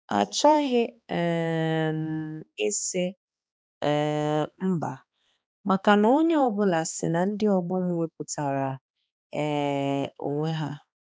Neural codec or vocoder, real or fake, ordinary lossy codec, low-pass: codec, 16 kHz, 2 kbps, X-Codec, HuBERT features, trained on balanced general audio; fake; none; none